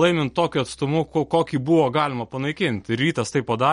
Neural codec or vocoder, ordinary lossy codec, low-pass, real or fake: none; MP3, 48 kbps; 19.8 kHz; real